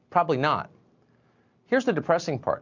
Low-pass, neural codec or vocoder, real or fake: 7.2 kHz; none; real